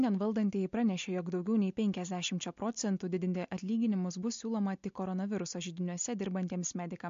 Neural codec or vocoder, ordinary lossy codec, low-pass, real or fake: none; MP3, 48 kbps; 7.2 kHz; real